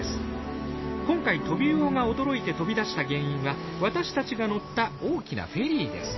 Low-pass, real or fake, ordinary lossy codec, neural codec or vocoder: 7.2 kHz; real; MP3, 24 kbps; none